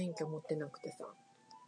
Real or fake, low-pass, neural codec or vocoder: real; 9.9 kHz; none